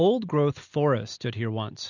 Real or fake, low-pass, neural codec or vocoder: real; 7.2 kHz; none